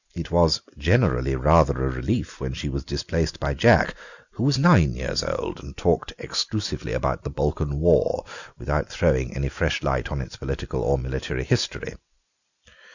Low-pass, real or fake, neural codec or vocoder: 7.2 kHz; real; none